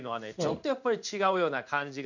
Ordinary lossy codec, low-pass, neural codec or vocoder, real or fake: none; 7.2 kHz; codec, 16 kHz in and 24 kHz out, 1 kbps, XY-Tokenizer; fake